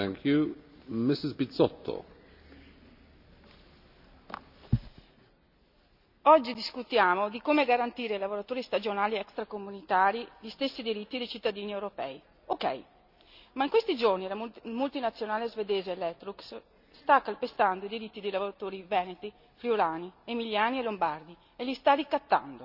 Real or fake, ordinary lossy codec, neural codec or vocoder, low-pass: real; none; none; 5.4 kHz